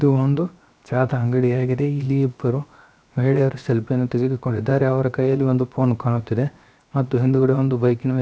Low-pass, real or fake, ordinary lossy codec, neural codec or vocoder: none; fake; none; codec, 16 kHz, 0.7 kbps, FocalCodec